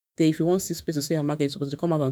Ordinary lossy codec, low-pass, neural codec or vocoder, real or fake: none; none; autoencoder, 48 kHz, 32 numbers a frame, DAC-VAE, trained on Japanese speech; fake